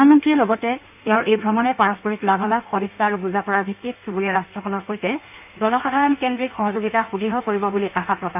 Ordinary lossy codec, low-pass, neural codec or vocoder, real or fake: none; 3.6 kHz; codec, 16 kHz in and 24 kHz out, 1.1 kbps, FireRedTTS-2 codec; fake